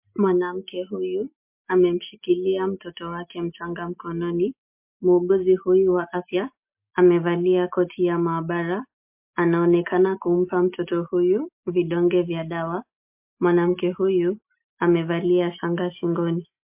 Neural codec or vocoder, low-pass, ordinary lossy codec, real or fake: none; 3.6 kHz; MP3, 32 kbps; real